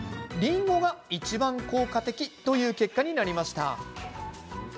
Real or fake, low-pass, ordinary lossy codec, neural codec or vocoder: real; none; none; none